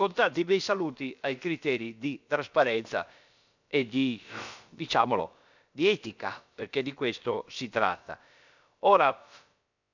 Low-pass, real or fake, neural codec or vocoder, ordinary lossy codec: 7.2 kHz; fake; codec, 16 kHz, about 1 kbps, DyCAST, with the encoder's durations; none